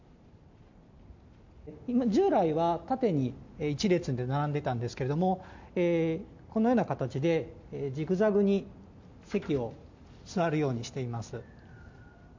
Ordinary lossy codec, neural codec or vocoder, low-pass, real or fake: MP3, 64 kbps; none; 7.2 kHz; real